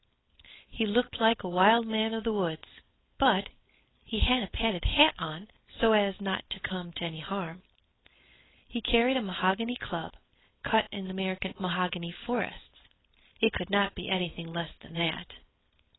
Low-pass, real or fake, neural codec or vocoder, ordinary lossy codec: 7.2 kHz; fake; codec, 16 kHz, 4.8 kbps, FACodec; AAC, 16 kbps